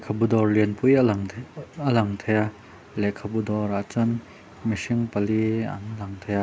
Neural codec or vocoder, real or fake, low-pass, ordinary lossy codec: none; real; none; none